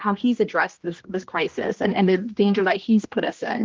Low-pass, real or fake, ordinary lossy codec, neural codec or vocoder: 7.2 kHz; fake; Opus, 24 kbps; codec, 16 kHz, 1 kbps, X-Codec, HuBERT features, trained on general audio